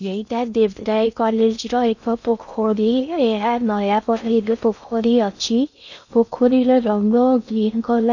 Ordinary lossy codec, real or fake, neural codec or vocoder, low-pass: none; fake; codec, 16 kHz in and 24 kHz out, 0.6 kbps, FocalCodec, streaming, 2048 codes; 7.2 kHz